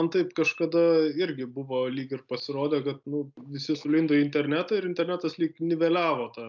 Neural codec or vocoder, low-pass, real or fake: none; 7.2 kHz; real